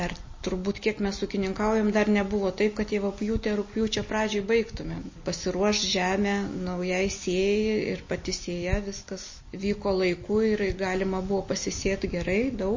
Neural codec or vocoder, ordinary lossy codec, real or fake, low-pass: none; MP3, 32 kbps; real; 7.2 kHz